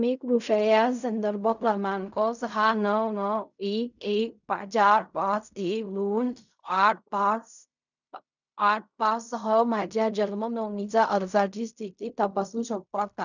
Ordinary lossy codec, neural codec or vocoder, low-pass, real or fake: none; codec, 16 kHz in and 24 kHz out, 0.4 kbps, LongCat-Audio-Codec, fine tuned four codebook decoder; 7.2 kHz; fake